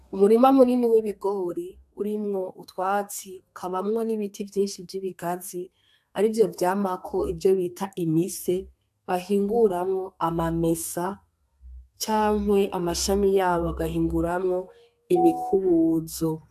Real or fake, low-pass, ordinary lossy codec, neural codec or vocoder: fake; 14.4 kHz; AAC, 96 kbps; codec, 32 kHz, 1.9 kbps, SNAC